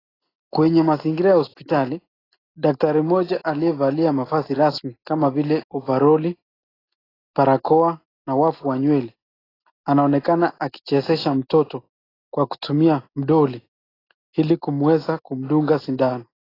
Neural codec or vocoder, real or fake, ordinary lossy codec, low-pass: none; real; AAC, 24 kbps; 5.4 kHz